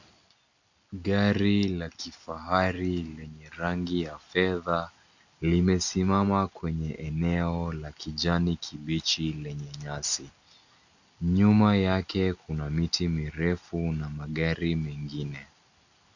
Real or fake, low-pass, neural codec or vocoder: real; 7.2 kHz; none